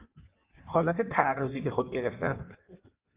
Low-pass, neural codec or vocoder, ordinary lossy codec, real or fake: 3.6 kHz; codec, 16 kHz in and 24 kHz out, 1.1 kbps, FireRedTTS-2 codec; Opus, 24 kbps; fake